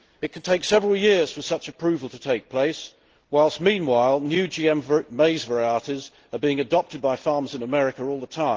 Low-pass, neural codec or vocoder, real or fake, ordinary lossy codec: 7.2 kHz; none; real; Opus, 16 kbps